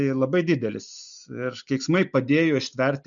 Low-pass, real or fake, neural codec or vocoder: 7.2 kHz; real; none